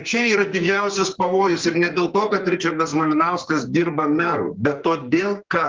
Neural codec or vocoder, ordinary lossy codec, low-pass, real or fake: codec, 44.1 kHz, 2.6 kbps, SNAC; Opus, 32 kbps; 7.2 kHz; fake